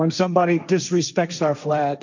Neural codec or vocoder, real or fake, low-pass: codec, 16 kHz, 1.1 kbps, Voila-Tokenizer; fake; 7.2 kHz